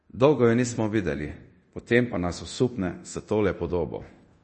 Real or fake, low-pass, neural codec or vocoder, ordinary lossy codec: fake; 10.8 kHz; codec, 24 kHz, 0.9 kbps, DualCodec; MP3, 32 kbps